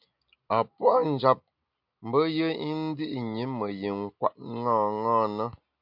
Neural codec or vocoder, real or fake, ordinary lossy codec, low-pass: none; real; MP3, 48 kbps; 5.4 kHz